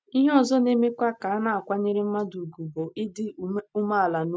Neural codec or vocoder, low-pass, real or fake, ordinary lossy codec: none; none; real; none